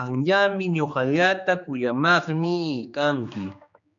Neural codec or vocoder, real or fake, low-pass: codec, 16 kHz, 2 kbps, X-Codec, HuBERT features, trained on general audio; fake; 7.2 kHz